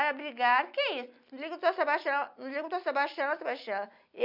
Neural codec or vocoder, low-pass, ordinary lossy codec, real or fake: none; 5.4 kHz; none; real